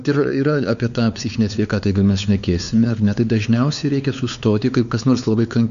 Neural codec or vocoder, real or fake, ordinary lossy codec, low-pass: codec, 16 kHz, 4 kbps, X-Codec, WavLM features, trained on Multilingual LibriSpeech; fake; MP3, 96 kbps; 7.2 kHz